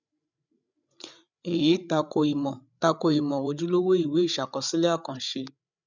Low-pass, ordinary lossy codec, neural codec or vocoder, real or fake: 7.2 kHz; none; codec, 16 kHz, 8 kbps, FreqCodec, larger model; fake